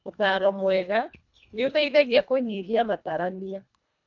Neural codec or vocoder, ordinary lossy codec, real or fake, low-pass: codec, 24 kHz, 1.5 kbps, HILCodec; none; fake; 7.2 kHz